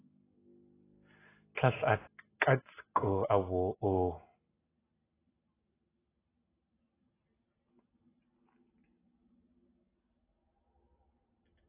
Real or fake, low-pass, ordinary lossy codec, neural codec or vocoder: real; 3.6 kHz; AAC, 16 kbps; none